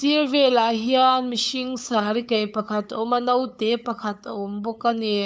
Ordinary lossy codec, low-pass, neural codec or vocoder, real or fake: none; none; codec, 16 kHz, 8 kbps, FunCodec, trained on LibriTTS, 25 frames a second; fake